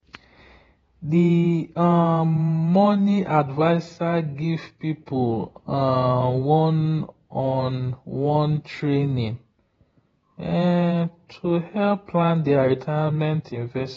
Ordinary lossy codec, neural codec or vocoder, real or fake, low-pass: AAC, 24 kbps; vocoder, 48 kHz, 128 mel bands, Vocos; fake; 19.8 kHz